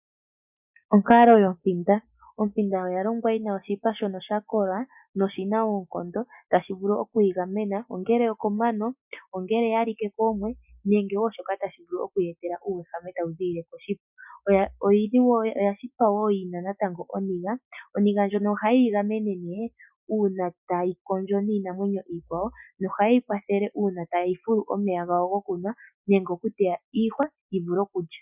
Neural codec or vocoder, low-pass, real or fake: none; 3.6 kHz; real